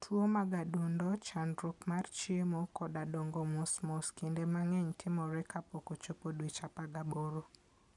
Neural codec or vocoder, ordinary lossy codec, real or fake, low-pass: none; none; real; 10.8 kHz